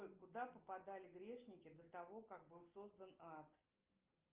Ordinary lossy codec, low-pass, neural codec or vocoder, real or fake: Opus, 24 kbps; 3.6 kHz; none; real